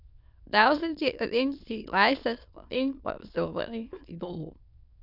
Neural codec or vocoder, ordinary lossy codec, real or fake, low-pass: autoencoder, 22.05 kHz, a latent of 192 numbers a frame, VITS, trained on many speakers; none; fake; 5.4 kHz